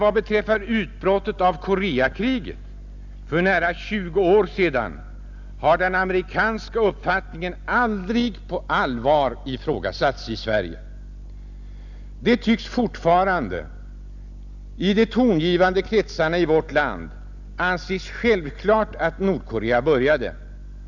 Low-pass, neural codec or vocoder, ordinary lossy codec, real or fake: 7.2 kHz; none; none; real